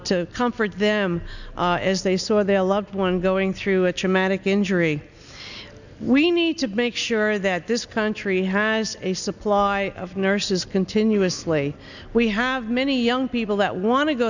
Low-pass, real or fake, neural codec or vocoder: 7.2 kHz; real; none